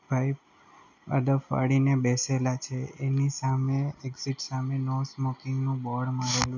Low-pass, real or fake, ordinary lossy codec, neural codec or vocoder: 7.2 kHz; real; none; none